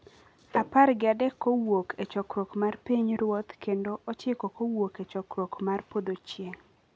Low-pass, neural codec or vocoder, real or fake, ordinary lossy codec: none; none; real; none